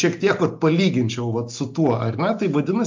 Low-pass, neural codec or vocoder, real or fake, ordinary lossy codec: 7.2 kHz; none; real; MP3, 48 kbps